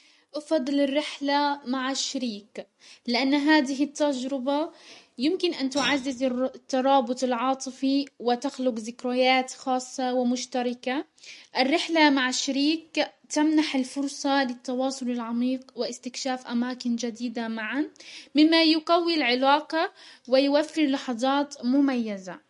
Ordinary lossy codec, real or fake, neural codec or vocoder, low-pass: MP3, 48 kbps; real; none; 14.4 kHz